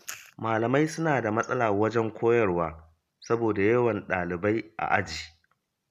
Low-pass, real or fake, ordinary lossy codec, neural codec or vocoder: 14.4 kHz; real; none; none